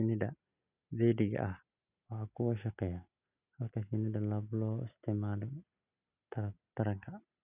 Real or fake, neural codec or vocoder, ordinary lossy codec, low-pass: real; none; none; 3.6 kHz